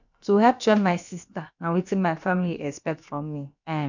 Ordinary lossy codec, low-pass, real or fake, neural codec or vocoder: none; 7.2 kHz; fake; codec, 16 kHz, about 1 kbps, DyCAST, with the encoder's durations